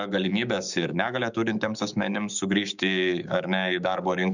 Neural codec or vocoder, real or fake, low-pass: codec, 16 kHz, 6 kbps, DAC; fake; 7.2 kHz